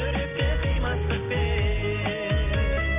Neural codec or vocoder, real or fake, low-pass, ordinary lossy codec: none; real; 3.6 kHz; none